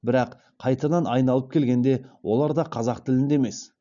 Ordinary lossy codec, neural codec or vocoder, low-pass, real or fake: none; none; 7.2 kHz; real